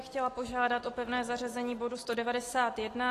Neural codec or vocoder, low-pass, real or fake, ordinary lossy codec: none; 14.4 kHz; real; AAC, 48 kbps